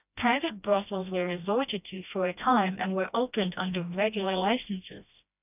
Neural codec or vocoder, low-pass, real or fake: codec, 16 kHz, 1 kbps, FreqCodec, smaller model; 3.6 kHz; fake